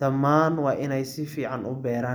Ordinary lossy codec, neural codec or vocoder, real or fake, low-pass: none; none; real; none